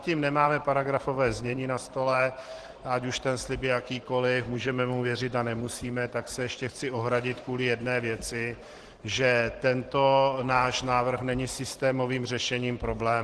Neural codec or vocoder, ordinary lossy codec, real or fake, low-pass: vocoder, 44.1 kHz, 128 mel bands every 512 samples, BigVGAN v2; Opus, 16 kbps; fake; 10.8 kHz